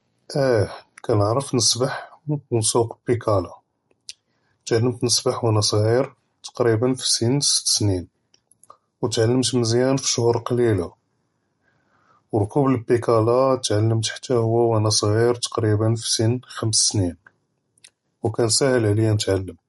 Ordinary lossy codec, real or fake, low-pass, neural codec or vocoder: MP3, 48 kbps; real; 19.8 kHz; none